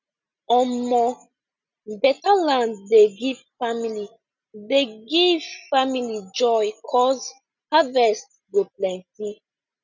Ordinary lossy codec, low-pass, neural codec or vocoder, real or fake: none; 7.2 kHz; none; real